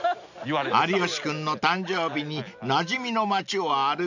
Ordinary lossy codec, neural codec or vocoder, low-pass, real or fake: none; none; 7.2 kHz; real